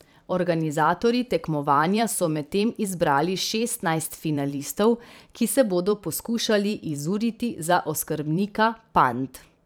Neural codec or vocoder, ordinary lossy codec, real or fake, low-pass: vocoder, 44.1 kHz, 128 mel bands every 512 samples, BigVGAN v2; none; fake; none